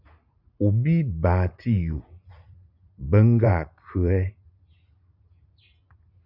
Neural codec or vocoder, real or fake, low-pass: vocoder, 44.1 kHz, 80 mel bands, Vocos; fake; 5.4 kHz